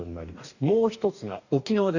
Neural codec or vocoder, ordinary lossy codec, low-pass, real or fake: codec, 44.1 kHz, 2.6 kbps, DAC; none; 7.2 kHz; fake